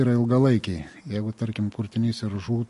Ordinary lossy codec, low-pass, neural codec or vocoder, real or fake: MP3, 48 kbps; 14.4 kHz; none; real